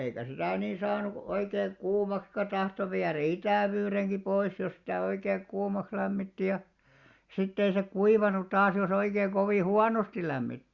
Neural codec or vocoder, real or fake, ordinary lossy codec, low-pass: none; real; Opus, 64 kbps; 7.2 kHz